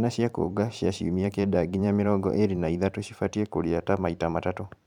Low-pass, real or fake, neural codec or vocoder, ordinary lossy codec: 19.8 kHz; fake; vocoder, 44.1 kHz, 128 mel bands every 512 samples, BigVGAN v2; none